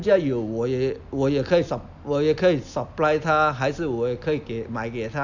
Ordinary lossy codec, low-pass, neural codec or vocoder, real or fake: none; 7.2 kHz; none; real